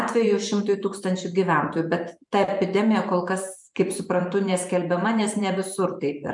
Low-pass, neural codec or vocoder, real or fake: 10.8 kHz; vocoder, 44.1 kHz, 128 mel bands every 512 samples, BigVGAN v2; fake